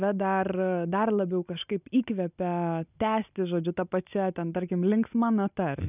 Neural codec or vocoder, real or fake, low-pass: none; real; 3.6 kHz